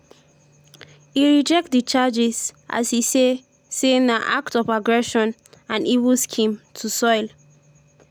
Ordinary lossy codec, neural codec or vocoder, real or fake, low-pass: none; none; real; none